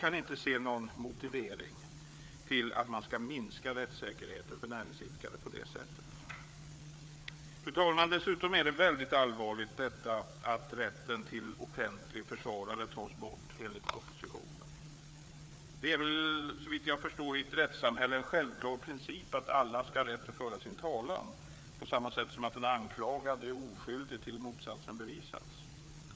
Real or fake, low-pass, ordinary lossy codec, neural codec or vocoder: fake; none; none; codec, 16 kHz, 4 kbps, FreqCodec, larger model